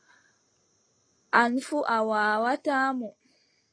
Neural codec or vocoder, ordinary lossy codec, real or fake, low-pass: none; AAC, 32 kbps; real; 9.9 kHz